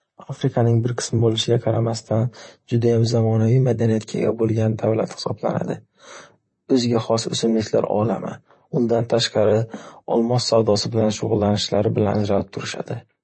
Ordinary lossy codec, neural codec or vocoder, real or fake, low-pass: MP3, 32 kbps; vocoder, 44.1 kHz, 128 mel bands, Pupu-Vocoder; fake; 9.9 kHz